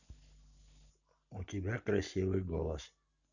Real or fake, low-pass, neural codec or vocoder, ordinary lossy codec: real; 7.2 kHz; none; none